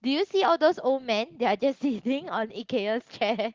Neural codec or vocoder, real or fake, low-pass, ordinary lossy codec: none; real; 7.2 kHz; Opus, 16 kbps